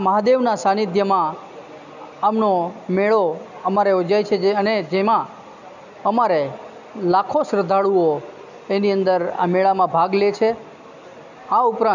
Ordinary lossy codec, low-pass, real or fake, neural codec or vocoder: none; 7.2 kHz; real; none